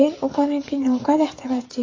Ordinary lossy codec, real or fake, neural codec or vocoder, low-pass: AAC, 32 kbps; fake; codec, 16 kHz, 16 kbps, FreqCodec, smaller model; 7.2 kHz